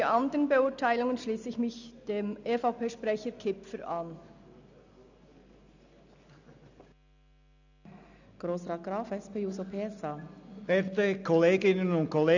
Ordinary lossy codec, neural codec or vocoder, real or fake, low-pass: none; none; real; 7.2 kHz